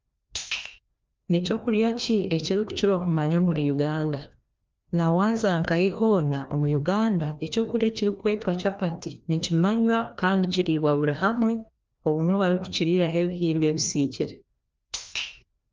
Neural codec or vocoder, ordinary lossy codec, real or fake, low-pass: codec, 16 kHz, 1 kbps, FreqCodec, larger model; Opus, 24 kbps; fake; 7.2 kHz